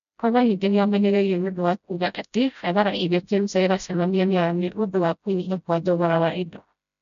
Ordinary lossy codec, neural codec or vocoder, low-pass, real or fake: none; codec, 16 kHz, 0.5 kbps, FreqCodec, smaller model; 7.2 kHz; fake